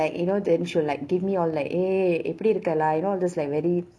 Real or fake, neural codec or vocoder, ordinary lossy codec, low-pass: real; none; none; none